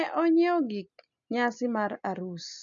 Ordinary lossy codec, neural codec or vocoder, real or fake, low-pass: none; none; real; 7.2 kHz